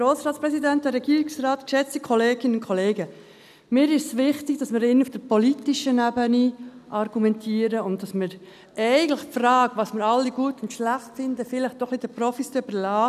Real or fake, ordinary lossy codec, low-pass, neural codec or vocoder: real; none; 14.4 kHz; none